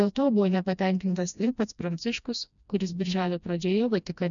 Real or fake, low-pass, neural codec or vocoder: fake; 7.2 kHz; codec, 16 kHz, 1 kbps, FreqCodec, smaller model